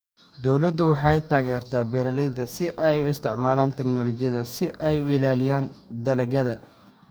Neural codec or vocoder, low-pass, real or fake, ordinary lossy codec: codec, 44.1 kHz, 2.6 kbps, DAC; none; fake; none